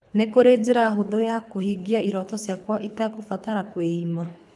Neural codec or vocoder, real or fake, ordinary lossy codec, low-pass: codec, 24 kHz, 3 kbps, HILCodec; fake; none; none